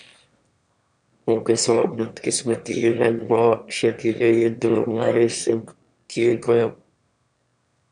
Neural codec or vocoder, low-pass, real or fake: autoencoder, 22.05 kHz, a latent of 192 numbers a frame, VITS, trained on one speaker; 9.9 kHz; fake